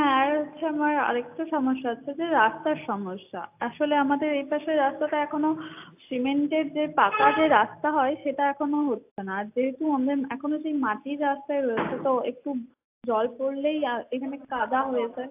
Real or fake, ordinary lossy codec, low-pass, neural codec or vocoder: real; AAC, 32 kbps; 3.6 kHz; none